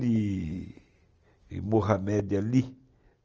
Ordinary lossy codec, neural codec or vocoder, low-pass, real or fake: Opus, 24 kbps; none; 7.2 kHz; real